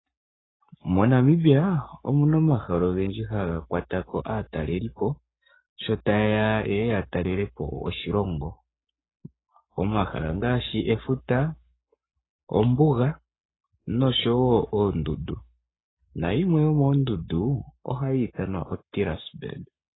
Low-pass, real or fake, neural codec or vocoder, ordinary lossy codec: 7.2 kHz; fake; codec, 44.1 kHz, 7.8 kbps, Pupu-Codec; AAC, 16 kbps